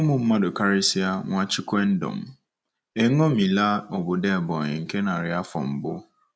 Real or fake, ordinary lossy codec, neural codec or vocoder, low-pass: real; none; none; none